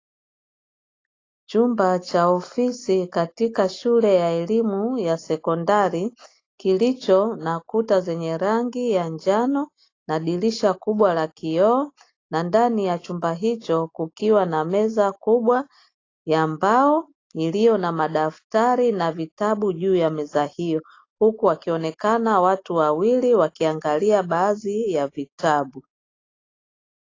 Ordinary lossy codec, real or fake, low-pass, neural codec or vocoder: AAC, 32 kbps; real; 7.2 kHz; none